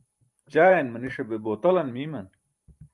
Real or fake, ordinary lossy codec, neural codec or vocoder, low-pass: fake; Opus, 32 kbps; vocoder, 44.1 kHz, 128 mel bands every 512 samples, BigVGAN v2; 10.8 kHz